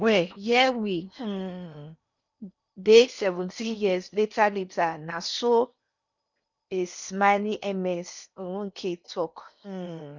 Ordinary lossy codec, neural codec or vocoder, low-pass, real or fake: none; codec, 16 kHz in and 24 kHz out, 0.8 kbps, FocalCodec, streaming, 65536 codes; 7.2 kHz; fake